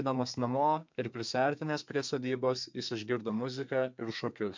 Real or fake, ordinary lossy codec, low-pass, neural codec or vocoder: fake; MP3, 64 kbps; 7.2 kHz; codec, 32 kHz, 1.9 kbps, SNAC